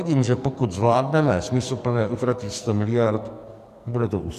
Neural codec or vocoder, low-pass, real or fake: codec, 44.1 kHz, 2.6 kbps, SNAC; 14.4 kHz; fake